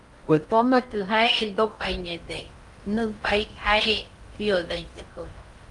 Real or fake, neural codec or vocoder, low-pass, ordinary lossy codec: fake; codec, 16 kHz in and 24 kHz out, 0.6 kbps, FocalCodec, streaming, 4096 codes; 10.8 kHz; Opus, 32 kbps